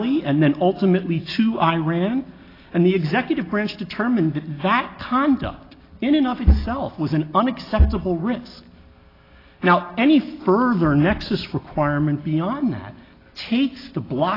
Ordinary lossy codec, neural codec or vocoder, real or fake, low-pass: AAC, 24 kbps; none; real; 5.4 kHz